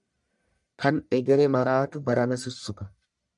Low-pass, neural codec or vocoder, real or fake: 10.8 kHz; codec, 44.1 kHz, 1.7 kbps, Pupu-Codec; fake